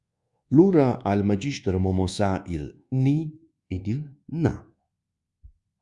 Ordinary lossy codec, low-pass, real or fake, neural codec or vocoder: Opus, 64 kbps; 10.8 kHz; fake; codec, 24 kHz, 1.2 kbps, DualCodec